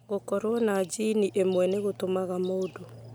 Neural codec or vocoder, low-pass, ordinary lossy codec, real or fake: none; none; none; real